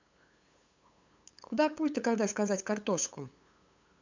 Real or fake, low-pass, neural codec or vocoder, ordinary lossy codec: fake; 7.2 kHz; codec, 16 kHz, 8 kbps, FunCodec, trained on LibriTTS, 25 frames a second; MP3, 64 kbps